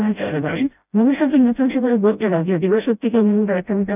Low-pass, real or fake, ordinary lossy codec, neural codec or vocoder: 3.6 kHz; fake; none; codec, 16 kHz, 0.5 kbps, FreqCodec, smaller model